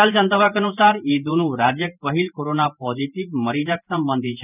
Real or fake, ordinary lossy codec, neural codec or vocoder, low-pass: fake; none; vocoder, 44.1 kHz, 128 mel bands every 512 samples, BigVGAN v2; 3.6 kHz